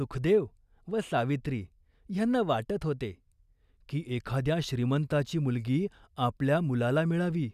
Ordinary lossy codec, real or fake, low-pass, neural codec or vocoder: none; real; 14.4 kHz; none